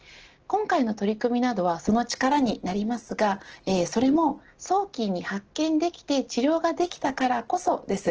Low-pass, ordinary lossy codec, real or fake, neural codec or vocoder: 7.2 kHz; Opus, 16 kbps; real; none